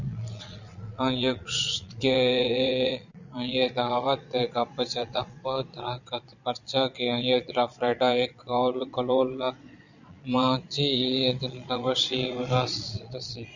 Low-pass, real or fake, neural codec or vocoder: 7.2 kHz; fake; vocoder, 22.05 kHz, 80 mel bands, Vocos